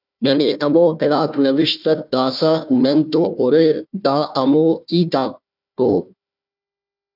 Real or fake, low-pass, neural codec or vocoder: fake; 5.4 kHz; codec, 16 kHz, 1 kbps, FunCodec, trained on Chinese and English, 50 frames a second